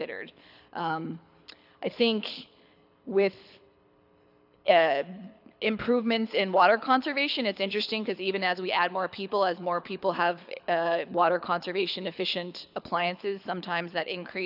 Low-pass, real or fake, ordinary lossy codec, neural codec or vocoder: 5.4 kHz; fake; AAC, 48 kbps; codec, 24 kHz, 6 kbps, HILCodec